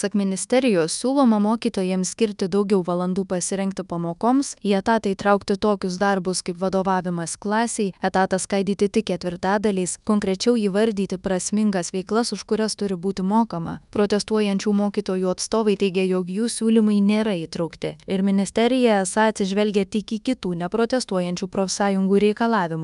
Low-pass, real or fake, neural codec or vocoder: 10.8 kHz; fake; codec, 24 kHz, 1.2 kbps, DualCodec